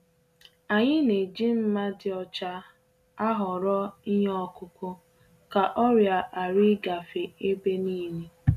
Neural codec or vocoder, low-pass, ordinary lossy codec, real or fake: none; 14.4 kHz; none; real